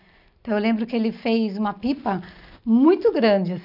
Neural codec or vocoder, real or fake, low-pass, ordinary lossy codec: none; real; 5.4 kHz; none